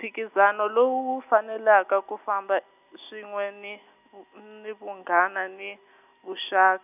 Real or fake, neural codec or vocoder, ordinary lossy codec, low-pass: real; none; none; 3.6 kHz